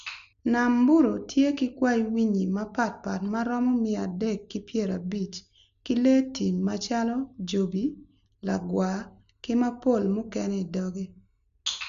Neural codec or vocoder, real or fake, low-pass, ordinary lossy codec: none; real; 7.2 kHz; MP3, 96 kbps